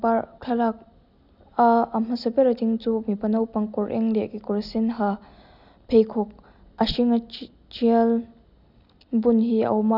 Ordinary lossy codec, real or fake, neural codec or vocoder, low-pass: none; real; none; 5.4 kHz